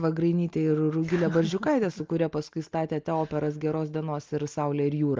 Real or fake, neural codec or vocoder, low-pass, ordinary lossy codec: real; none; 7.2 kHz; Opus, 24 kbps